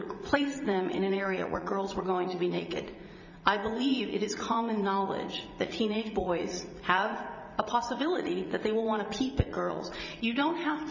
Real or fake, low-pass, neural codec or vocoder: fake; 7.2 kHz; vocoder, 44.1 kHz, 80 mel bands, Vocos